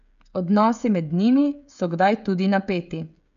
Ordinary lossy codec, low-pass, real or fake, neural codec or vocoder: none; 7.2 kHz; fake; codec, 16 kHz, 16 kbps, FreqCodec, smaller model